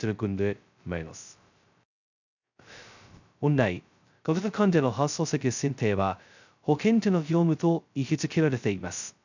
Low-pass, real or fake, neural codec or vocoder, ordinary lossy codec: 7.2 kHz; fake; codec, 16 kHz, 0.2 kbps, FocalCodec; none